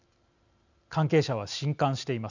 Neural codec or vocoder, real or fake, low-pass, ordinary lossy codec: none; real; 7.2 kHz; none